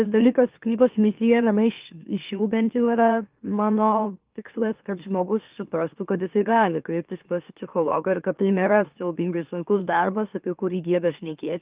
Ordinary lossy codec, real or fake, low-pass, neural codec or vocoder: Opus, 24 kbps; fake; 3.6 kHz; autoencoder, 44.1 kHz, a latent of 192 numbers a frame, MeloTTS